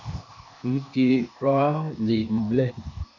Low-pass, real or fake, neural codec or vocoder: 7.2 kHz; fake; codec, 16 kHz, 0.8 kbps, ZipCodec